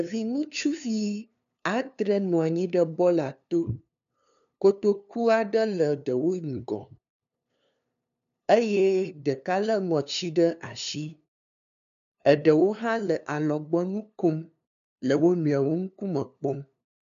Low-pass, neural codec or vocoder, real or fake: 7.2 kHz; codec, 16 kHz, 2 kbps, FunCodec, trained on LibriTTS, 25 frames a second; fake